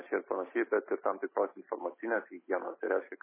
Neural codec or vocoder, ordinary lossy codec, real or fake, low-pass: none; MP3, 16 kbps; real; 3.6 kHz